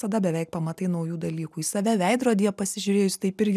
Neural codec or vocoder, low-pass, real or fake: none; 14.4 kHz; real